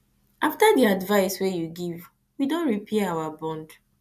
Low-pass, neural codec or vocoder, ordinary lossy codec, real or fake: 14.4 kHz; none; none; real